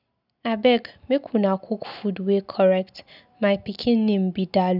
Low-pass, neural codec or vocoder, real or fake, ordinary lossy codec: 5.4 kHz; none; real; none